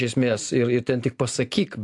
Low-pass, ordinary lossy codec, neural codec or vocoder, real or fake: 10.8 kHz; AAC, 64 kbps; none; real